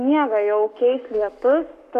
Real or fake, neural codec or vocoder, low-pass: fake; codec, 44.1 kHz, 7.8 kbps, Pupu-Codec; 14.4 kHz